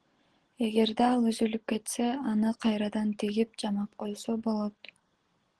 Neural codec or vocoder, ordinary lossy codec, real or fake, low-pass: none; Opus, 16 kbps; real; 10.8 kHz